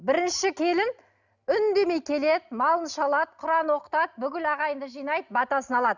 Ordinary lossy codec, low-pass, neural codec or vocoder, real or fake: none; 7.2 kHz; none; real